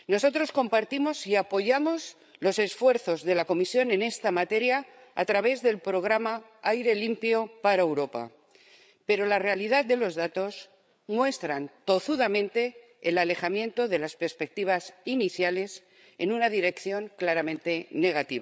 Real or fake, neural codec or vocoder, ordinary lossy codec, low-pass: fake; codec, 16 kHz, 8 kbps, FreqCodec, larger model; none; none